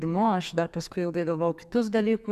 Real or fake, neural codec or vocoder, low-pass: fake; codec, 44.1 kHz, 2.6 kbps, SNAC; 14.4 kHz